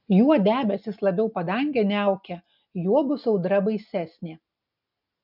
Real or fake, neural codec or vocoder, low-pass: real; none; 5.4 kHz